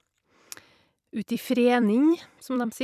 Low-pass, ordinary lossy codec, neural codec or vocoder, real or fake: 14.4 kHz; none; vocoder, 44.1 kHz, 128 mel bands every 256 samples, BigVGAN v2; fake